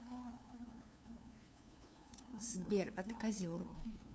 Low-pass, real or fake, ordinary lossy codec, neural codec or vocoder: none; fake; none; codec, 16 kHz, 2 kbps, FunCodec, trained on LibriTTS, 25 frames a second